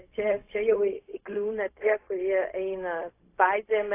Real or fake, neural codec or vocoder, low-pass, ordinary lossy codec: fake; codec, 16 kHz, 0.4 kbps, LongCat-Audio-Codec; 3.6 kHz; AAC, 24 kbps